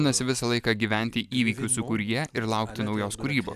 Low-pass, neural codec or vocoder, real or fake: 14.4 kHz; none; real